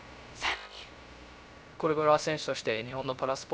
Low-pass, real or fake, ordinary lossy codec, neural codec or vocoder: none; fake; none; codec, 16 kHz, 0.3 kbps, FocalCodec